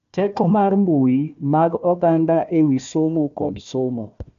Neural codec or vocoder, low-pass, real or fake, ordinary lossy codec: codec, 16 kHz, 1 kbps, FunCodec, trained on Chinese and English, 50 frames a second; 7.2 kHz; fake; AAC, 64 kbps